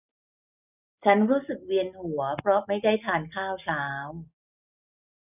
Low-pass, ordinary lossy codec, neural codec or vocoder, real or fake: 3.6 kHz; none; none; real